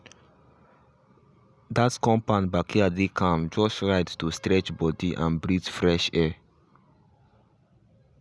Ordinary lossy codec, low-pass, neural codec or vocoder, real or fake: none; none; none; real